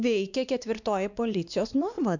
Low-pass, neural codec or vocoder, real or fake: 7.2 kHz; codec, 16 kHz, 2 kbps, X-Codec, WavLM features, trained on Multilingual LibriSpeech; fake